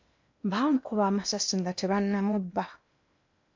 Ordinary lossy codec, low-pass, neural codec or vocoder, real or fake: MP3, 64 kbps; 7.2 kHz; codec, 16 kHz in and 24 kHz out, 0.8 kbps, FocalCodec, streaming, 65536 codes; fake